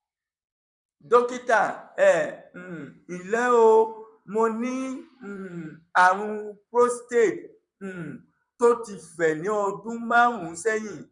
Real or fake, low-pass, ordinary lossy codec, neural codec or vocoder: fake; 10.8 kHz; none; vocoder, 44.1 kHz, 128 mel bands, Pupu-Vocoder